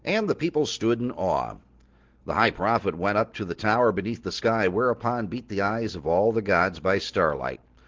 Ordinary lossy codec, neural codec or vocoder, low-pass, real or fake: Opus, 16 kbps; none; 7.2 kHz; real